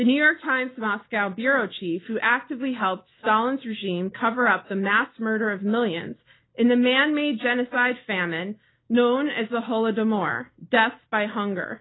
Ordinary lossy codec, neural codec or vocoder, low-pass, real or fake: AAC, 16 kbps; none; 7.2 kHz; real